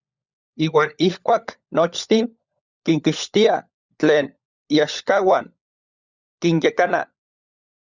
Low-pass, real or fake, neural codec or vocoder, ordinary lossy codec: 7.2 kHz; fake; codec, 16 kHz, 16 kbps, FunCodec, trained on LibriTTS, 50 frames a second; Opus, 64 kbps